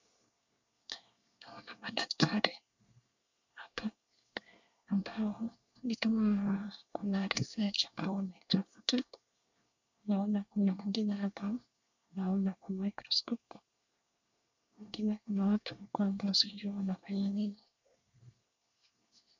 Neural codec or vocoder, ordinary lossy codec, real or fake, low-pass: codec, 24 kHz, 1 kbps, SNAC; MP3, 64 kbps; fake; 7.2 kHz